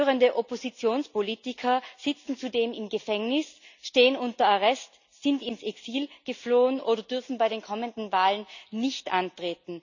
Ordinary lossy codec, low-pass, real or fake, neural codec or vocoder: none; 7.2 kHz; real; none